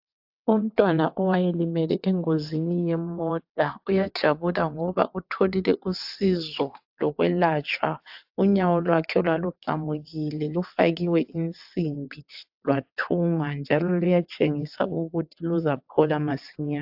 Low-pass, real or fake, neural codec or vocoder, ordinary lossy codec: 5.4 kHz; fake; vocoder, 22.05 kHz, 80 mel bands, WaveNeXt; AAC, 48 kbps